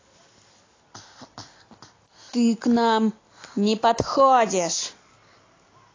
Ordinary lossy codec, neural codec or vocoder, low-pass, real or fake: AAC, 32 kbps; none; 7.2 kHz; real